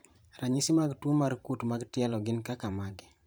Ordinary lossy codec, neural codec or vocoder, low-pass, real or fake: none; vocoder, 44.1 kHz, 128 mel bands every 512 samples, BigVGAN v2; none; fake